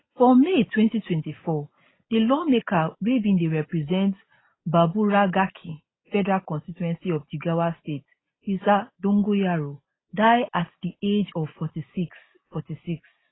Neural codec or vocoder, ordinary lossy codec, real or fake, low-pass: none; AAC, 16 kbps; real; 7.2 kHz